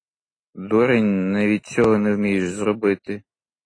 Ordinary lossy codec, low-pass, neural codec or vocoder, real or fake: AAC, 32 kbps; 9.9 kHz; none; real